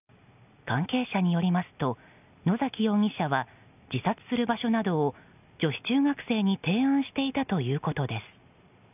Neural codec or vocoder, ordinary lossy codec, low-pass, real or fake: none; none; 3.6 kHz; real